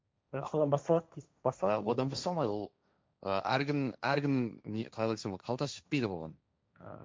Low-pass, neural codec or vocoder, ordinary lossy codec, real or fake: none; codec, 16 kHz, 1.1 kbps, Voila-Tokenizer; none; fake